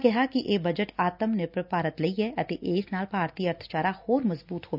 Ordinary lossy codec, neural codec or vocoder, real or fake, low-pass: none; none; real; 5.4 kHz